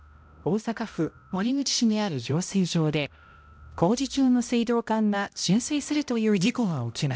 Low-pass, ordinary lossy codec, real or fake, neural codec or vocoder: none; none; fake; codec, 16 kHz, 0.5 kbps, X-Codec, HuBERT features, trained on balanced general audio